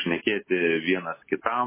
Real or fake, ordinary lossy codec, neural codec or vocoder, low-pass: real; MP3, 16 kbps; none; 3.6 kHz